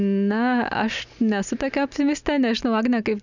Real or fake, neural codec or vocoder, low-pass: real; none; 7.2 kHz